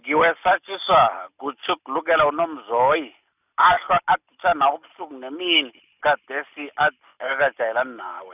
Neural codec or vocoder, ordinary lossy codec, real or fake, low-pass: none; none; real; 3.6 kHz